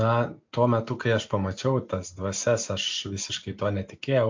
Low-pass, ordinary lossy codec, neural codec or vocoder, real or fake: 7.2 kHz; MP3, 48 kbps; none; real